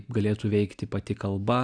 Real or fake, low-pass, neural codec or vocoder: real; 9.9 kHz; none